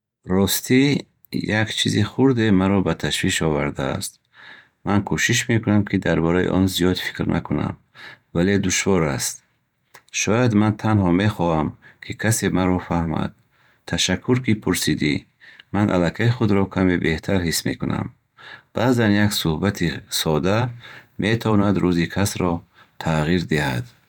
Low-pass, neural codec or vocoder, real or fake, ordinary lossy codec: 19.8 kHz; vocoder, 44.1 kHz, 128 mel bands every 512 samples, BigVGAN v2; fake; none